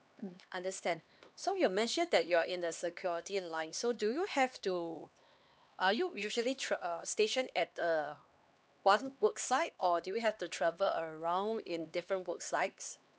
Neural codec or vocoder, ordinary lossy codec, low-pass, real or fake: codec, 16 kHz, 2 kbps, X-Codec, HuBERT features, trained on LibriSpeech; none; none; fake